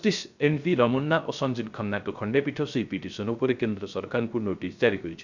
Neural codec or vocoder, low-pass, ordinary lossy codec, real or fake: codec, 16 kHz, 0.3 kbps, FocalCodec; 7.2 kHz; none; fake